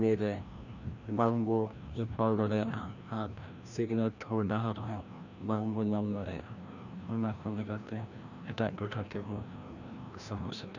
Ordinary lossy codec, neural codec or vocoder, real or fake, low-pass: none; codec, 16 kHz, 1 kbps, FreqCodec, larger model; fake; 7.2 kHz